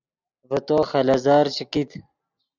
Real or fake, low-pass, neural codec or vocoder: real; 7.2 kHz; none